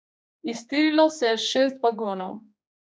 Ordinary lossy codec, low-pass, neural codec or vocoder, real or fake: none; none; codec, 16 kHz, 2 kbps, X-Codec, HuBERT features, trained on general audio; fake